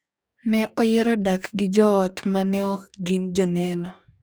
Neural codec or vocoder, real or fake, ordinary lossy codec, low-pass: codec, 44.1 kHz, 2.6 kbps, DAC; fake; none; none